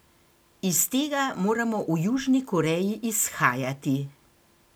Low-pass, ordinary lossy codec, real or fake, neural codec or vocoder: none; none; real; none